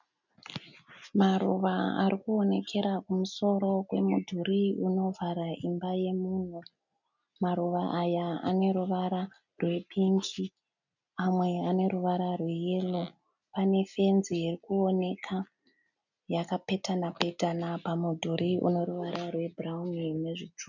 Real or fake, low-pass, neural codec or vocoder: real; 7.2 kHz; none